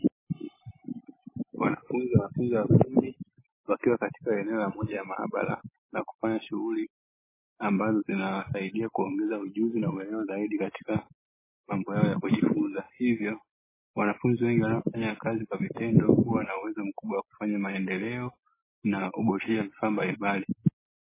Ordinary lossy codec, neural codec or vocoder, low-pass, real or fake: MP3, 16 kbps; none; 3.6 kHz; real